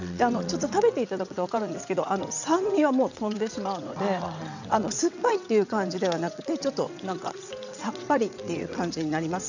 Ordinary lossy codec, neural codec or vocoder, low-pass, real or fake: none; vocoder, 22.05 kHz, 80 mel bands, WaveNeXt; 7.2 kHz; fake